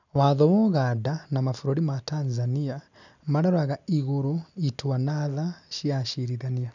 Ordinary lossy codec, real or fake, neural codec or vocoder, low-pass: none; real; none; 7.2 kHz